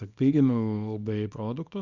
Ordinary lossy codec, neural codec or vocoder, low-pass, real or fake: Opus, 64 kbps; codec, 24 kHz, 0.9 kbps, WavTokenizer, small release; 7.2 kHz; fake